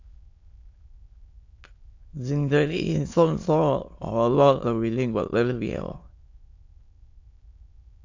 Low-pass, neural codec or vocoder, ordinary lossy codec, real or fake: 7.2 kHz; autoencoder, 22.05 kHz, a latent of 192 numbers a frame, VITS, trained on many speakers; AAC, 48 kbps; fake